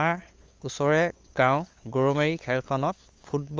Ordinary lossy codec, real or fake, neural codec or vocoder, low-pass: Opus, 32 kbps; fake; codec, 16 kHz, 8 kbps, FunCodec, trained on LibriTTS, 25 frames a second; 7.2 kHz